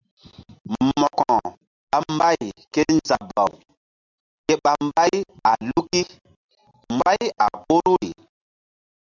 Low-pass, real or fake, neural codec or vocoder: 7.2 kHz; real; none